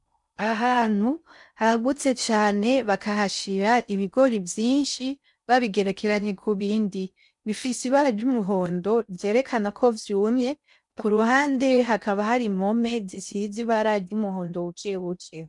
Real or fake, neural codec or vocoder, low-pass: fake; codec, 16 kHz in and 24 kHz out, 0.6 kbps, FocalCodec, streaming, 4096 codes; 10.8 kHz